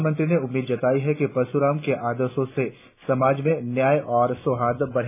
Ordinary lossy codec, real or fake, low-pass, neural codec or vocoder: none; real; 3.6 kHz; none